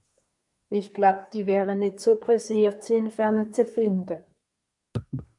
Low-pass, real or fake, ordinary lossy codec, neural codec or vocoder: 10.8 kHz; fake; MP3, 96 kbps; codec, 24 kHz, 1 kbps, SNAC